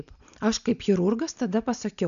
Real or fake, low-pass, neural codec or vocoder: real; 7.2 kHz; none